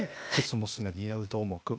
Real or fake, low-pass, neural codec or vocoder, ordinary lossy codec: fake; none; codec, 16 kHz, 0.8 kbps, ZipCodec; none